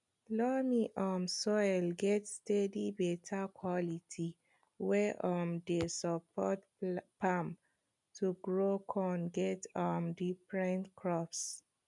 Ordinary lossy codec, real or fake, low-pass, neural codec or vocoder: none; real; 10.8 kHz; none